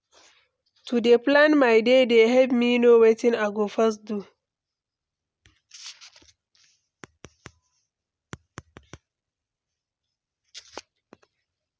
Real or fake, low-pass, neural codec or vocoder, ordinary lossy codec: real; none; none; none